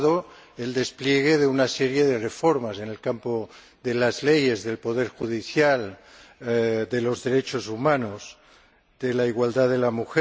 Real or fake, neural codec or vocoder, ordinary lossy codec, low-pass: real; none; none; none